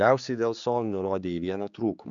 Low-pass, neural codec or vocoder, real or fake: 7.2 kHz; codec, 16 kHz, 4 kbps, X-Codec, HuBERT features, trained on general audio; fake